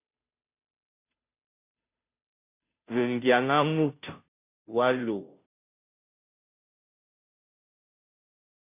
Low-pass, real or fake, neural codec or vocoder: 3.6 kHz; fake; codec, 16 kHz, 0.5 kbps, FunCodec, trained on Chinese and English, 25 frames a second